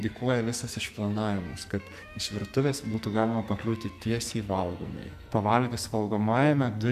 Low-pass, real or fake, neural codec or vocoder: 14.4 kHz; fake; codec, 44.1 kHz, 2.6 kbps, SNAC